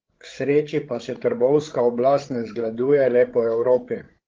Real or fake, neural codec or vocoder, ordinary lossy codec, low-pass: fake; codec, 16 kHz, 16 kbps, FreqCodec, larger model; Opus, 16 kbps; 7.2 kHz